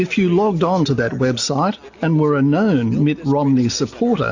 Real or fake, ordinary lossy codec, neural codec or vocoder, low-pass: real; AAC, 48 kbps; none; 7.2 kHz